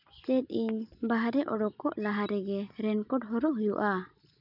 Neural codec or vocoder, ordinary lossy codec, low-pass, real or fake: none; none; 5.4 kHz; real